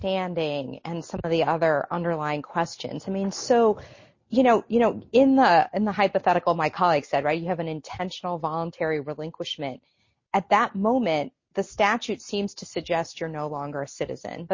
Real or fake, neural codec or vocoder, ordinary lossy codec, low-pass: real; none; MP3, 32 kbps; 7.2 kHz